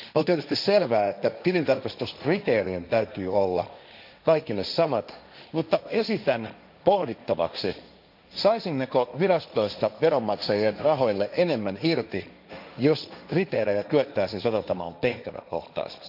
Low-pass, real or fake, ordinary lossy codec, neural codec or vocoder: 5.4 kHz; fake; none; codec, 16 kHz, 1.1 kbps, Voila-Tokenizer